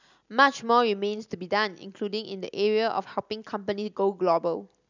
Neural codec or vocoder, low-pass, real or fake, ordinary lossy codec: none; 7.2 kHz; real; none